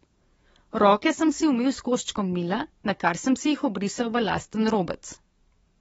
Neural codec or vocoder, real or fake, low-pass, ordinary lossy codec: codec, 44.1 kHz, 7.8 kbps, DAC; fake; 19.8 kHz; AAC, 24 kbps